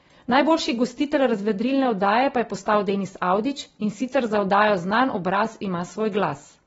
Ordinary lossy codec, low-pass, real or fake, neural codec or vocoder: AAC, 24 kbps; 10.8 kHz; real; none